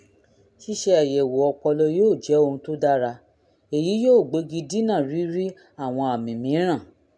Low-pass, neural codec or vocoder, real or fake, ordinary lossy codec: none; none; real; none